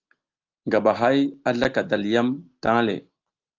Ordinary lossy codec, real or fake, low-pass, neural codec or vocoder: Opus, 24 kbps; real; 7.2 kHz; none